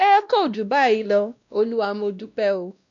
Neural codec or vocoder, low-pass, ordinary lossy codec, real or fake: codec, 16 kHz, 1 kbps, X-Codec, WavLM features, trained on Multilingual LibriSpeech; 7.2 kHz; none; fake